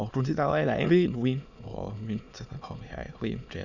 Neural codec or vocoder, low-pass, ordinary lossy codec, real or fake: autoencoder, 22.05 kHz, a latent of 192 numbers a frame, VITS, trained on many speakers; 7.2 kHz; none; fake